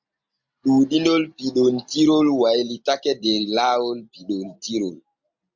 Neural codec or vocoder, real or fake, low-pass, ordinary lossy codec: none; real; 7.2 kHz; MP3, 64 kbps